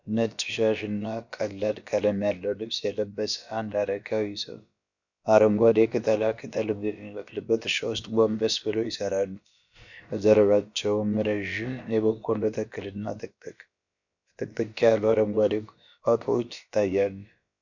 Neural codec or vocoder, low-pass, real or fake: codec, 16 kHz, about 1 kbps, DyCAST, with the encoder's durations; 7.2 kHz; fake